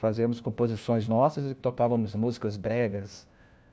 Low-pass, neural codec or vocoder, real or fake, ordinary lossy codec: none; codec, 16 kHz, 1 kbps, FunCodec, trained on LibriTTS, 50 frames a second; fake; none